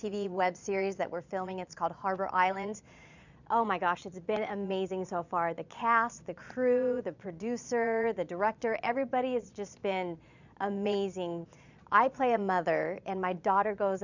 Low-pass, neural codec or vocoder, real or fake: 7.2 kHz; vocoder, 22.05 kHz, 80 mel bands, Vocos; fake